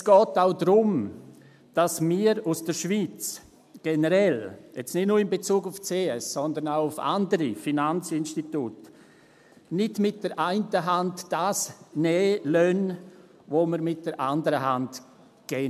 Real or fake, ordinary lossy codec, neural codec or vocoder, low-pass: real; MP3, 96 kbps; none; 14.4 kHz